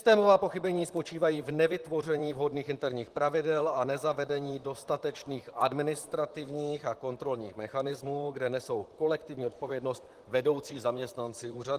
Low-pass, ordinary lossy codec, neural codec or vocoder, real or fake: 14.4 kHz; Opus, 24 kbps; vocoder, 44.1 kHz, 128 mel bands, Pupu-Vocoder; fake